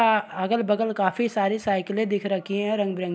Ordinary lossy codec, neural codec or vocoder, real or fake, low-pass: none; none; real; none